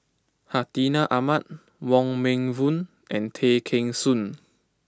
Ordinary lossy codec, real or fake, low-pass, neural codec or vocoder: none; real; none; none